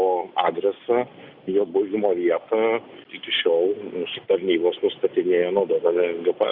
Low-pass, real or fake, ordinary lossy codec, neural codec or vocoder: 5.4 kHz; real; Opus, 64 kbps; none